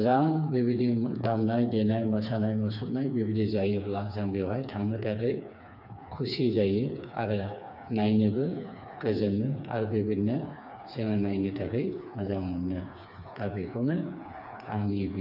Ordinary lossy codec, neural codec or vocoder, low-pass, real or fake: none; codec, 16 kHz, 4 kbps, FreqCodec, smaller model; 5.4 kHz; fake